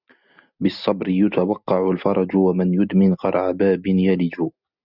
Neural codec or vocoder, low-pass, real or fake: none; 5.4 kHz; real